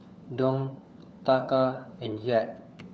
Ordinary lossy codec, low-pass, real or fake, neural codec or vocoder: none; none; fake; codec, 16 kHz, 4 kbps, FunCodec, trained on LibriTTS, 50 frames a second